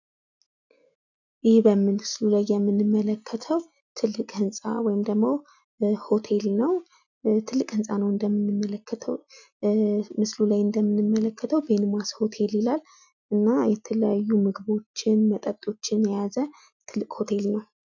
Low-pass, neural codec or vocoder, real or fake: 7.2 kHz; none; real